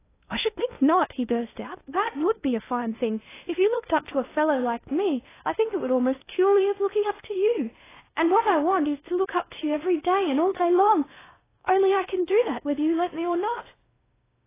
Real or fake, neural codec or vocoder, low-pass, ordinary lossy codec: fake; codec, 16 kHz in and 24 kHz out, 0.8 kbps, FocalCodec, streaming, 65536 codes; 3.6 kHz; AAC, 16 kbps